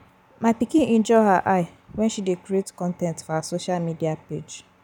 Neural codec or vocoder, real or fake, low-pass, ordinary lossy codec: none; real; 19.8 kHz; none